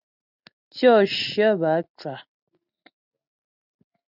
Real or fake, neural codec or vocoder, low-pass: real; none; 5.4 kHz